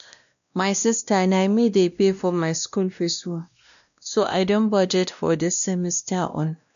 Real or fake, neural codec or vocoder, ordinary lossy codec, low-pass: fake; codec, 16 kHz, 1 kbps, X-Codec, WavLM features, trained on Multilingual LibriSpeech; none; 7.2 kHz